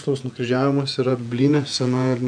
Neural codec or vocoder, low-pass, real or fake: vocoder, 48 kHz, 128 mel bands, Vocos; 9.9 kHz; fake